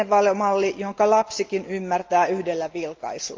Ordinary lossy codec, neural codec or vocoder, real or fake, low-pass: Opus, 24 kbps; none; real; 7.2 kHz